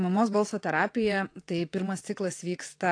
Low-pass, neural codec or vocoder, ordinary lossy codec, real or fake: 9.9 kHz; vocoder, 22.05 kHz, 80 mel bands, WaveNeXt; AAC, 48 kbps; fake